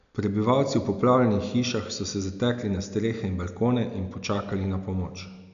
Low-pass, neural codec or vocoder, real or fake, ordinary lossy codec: 7.2 kHz; none; real; none